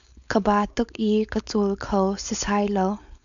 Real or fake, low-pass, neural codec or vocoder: fake; 7.2 kHz; codec, 16 kHz, 4.8 kbps, FACodec